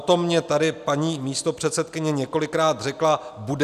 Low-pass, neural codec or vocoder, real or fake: 14.4 kHz; none; real